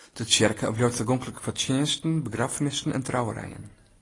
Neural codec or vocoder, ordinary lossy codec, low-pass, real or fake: none; AAC, 32 kbps; 10.8 kHz; real